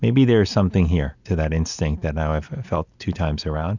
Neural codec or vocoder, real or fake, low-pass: none; real; 7.2 kHz